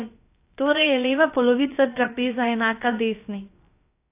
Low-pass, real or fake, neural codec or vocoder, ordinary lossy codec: 3.6 kHz; fake; codec, 16 kHz, about 1 kbps, DyCAST, with the encoder's durations; AAC, 24 kbps